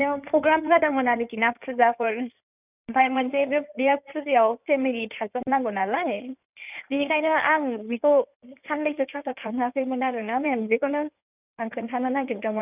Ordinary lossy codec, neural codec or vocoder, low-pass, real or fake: none; codec, 16 kHz in and 24 kHz out, 2.2 kbps, FireRedTTS-2 codec; 3.6 kHz; fake